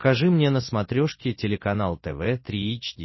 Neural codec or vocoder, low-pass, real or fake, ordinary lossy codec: none; 7.2 kHz; real; MP3, 24 kbps